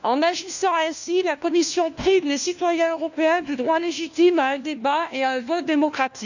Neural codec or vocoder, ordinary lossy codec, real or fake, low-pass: codec, 16 kHz, 1 kbps, FunCodec, trained on LibriTTS, 50 frames a second; none; fake; 7.2 kHz